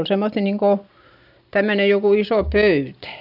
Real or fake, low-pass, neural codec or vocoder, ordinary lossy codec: real; 5.4 kHz; none; none